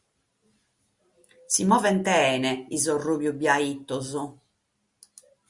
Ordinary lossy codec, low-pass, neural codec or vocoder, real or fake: Opus, 64 kbps; 10.8 kHz; none; real